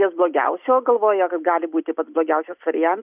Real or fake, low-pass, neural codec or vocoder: real; 3.6 kHz; none